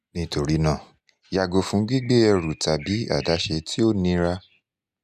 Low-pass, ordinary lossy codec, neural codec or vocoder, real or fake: 14.4 kHz; none; none; real